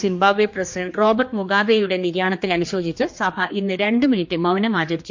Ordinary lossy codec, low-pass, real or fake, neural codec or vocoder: MP3, 48 kbps; 7.2 kHz; fake; codec, 16 kHz, 2 kbps, X-Codec, HuBERT features, trained on general audio